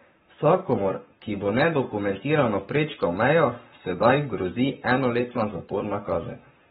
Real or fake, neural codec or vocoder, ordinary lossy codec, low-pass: fake; codec, 44.1 kHz, 7.8 kbps, Pupu-Codec; AAC, 16 kbps; 19.8 kHz